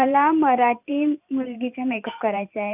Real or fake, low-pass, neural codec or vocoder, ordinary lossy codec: fake; 3.6 kHz; vocoder, 44.1 kHz, 128 mel bands every 512 samples, BigVGAN v2; none